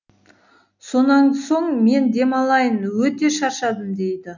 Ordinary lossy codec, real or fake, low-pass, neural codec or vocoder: none; real; 7.2 kHz; none